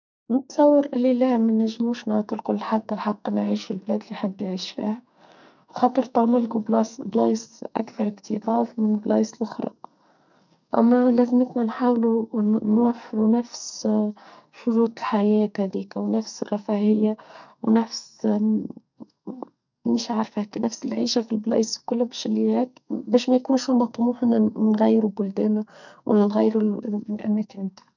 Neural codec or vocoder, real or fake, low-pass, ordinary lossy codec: codec, 44.1 kHz, 2.6 kbps, SNAC; fake; 7.2 kHz; none